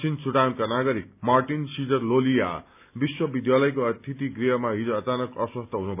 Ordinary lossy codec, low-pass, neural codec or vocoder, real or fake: none; 3.6 kHz; none; real